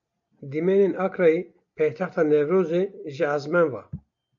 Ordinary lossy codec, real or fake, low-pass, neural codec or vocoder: AAC, 64 kbps; real; 7.2 kHz; none